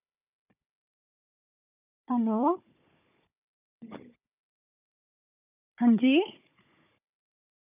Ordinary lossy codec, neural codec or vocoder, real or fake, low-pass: none; codec, 16 kHz, 16 kbps, FunCodec, trained on Chinese and English, 50 frames a second; fake; 3.6 kHz